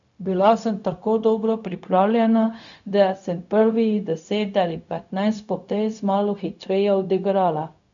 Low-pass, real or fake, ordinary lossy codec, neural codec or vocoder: 7.2 kHz; fake; none; codec, 16 kHz, 0.4 kbps, LongCat-Audio-Codec